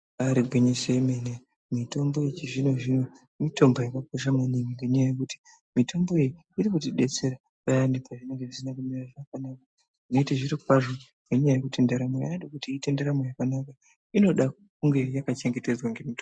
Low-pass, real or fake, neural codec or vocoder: 9.9 kHz; real; none